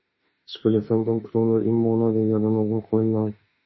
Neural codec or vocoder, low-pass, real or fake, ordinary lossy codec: autoencoder, 48 kHz, 32 numbers a frame, DAC-VAE, trained on Japanese speech; 7.2 kHz; fake; MP3, 24 kbps